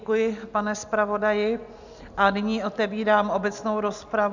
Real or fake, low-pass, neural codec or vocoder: real; 7.2 kHz; none